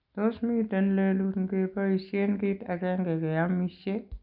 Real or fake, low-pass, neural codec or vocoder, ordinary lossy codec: real; 5.4 kHz; none; none